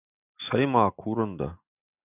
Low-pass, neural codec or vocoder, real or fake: 3.6 kHz; none; real